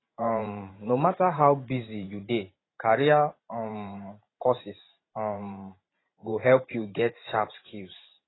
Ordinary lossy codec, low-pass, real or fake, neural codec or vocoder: AAC, 16 kbps; 7.2 kHz; fake; vocoder, 44.1 kHz, 128 mel bands every 512 samples, BigVGAN v2